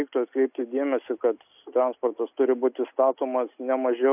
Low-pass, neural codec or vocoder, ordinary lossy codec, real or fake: 3.6 kHz; none; AAC, 32 kbps; real